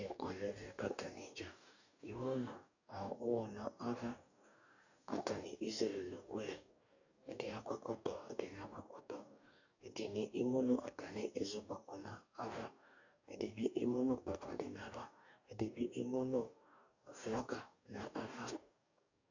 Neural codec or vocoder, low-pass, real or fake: codec, 44.1 kHz, 2.6 kbps, DAC; 7.2 kHz; fake